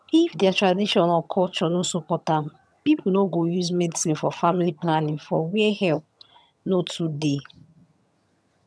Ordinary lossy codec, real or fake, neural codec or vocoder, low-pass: none; fake; vocoder, 22.05 kHz, 80 mel bands, HiFi-GAN; none